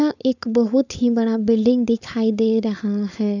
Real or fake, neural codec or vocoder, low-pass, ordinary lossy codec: fake; codec, 16 kHz, 4.8 kbps, FACodec; 7.2 kHz; none